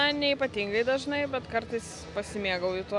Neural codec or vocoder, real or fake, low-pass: none; real; 10.8 kHz